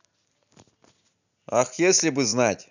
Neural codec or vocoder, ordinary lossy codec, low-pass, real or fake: none; none; 7.2 kHz; real